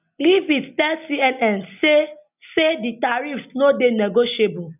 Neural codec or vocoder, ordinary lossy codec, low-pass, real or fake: none; none; 3.6 kHz; real